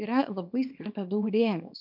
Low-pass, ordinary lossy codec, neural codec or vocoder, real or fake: 5.4 kHz; MP3, 48 kbps; codec, 24 kHz, 0.9 kbps, WavTokenizer, small release; fake